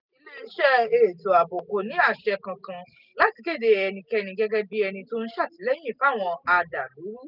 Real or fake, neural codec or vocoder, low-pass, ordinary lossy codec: real; none; 5.4 kHz; none